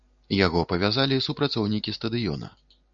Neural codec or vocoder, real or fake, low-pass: none; real; 7.2 kHz